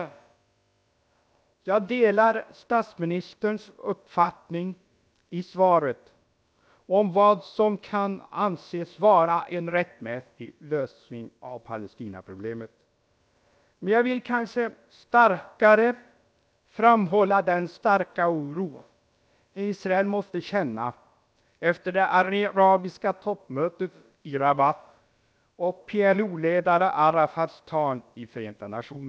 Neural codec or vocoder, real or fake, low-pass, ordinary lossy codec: codec, 16 kHz, about 1 kbps, DyCAST, with the encoder's durations; fake; none; none